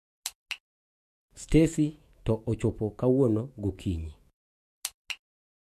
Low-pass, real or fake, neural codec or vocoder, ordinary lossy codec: 14.4 kHz; fake; autoencoder, 48 kHz, 128 numbers a frame, DAC-VAE, trained on Japanese speech; MP3, 64 kbps